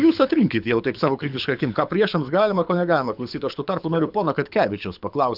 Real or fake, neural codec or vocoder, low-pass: fake; codec, 24 kHz, 6 kbps, HILCodec; 5.4 kHz